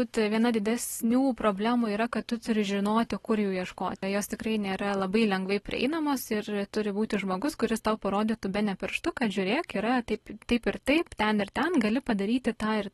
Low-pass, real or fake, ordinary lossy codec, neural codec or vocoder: 19.8 kHz; real; AAC, 32 kbps; none